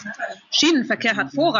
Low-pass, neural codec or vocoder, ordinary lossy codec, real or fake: 7.2 kHz; none; MP3, 64 kbps; real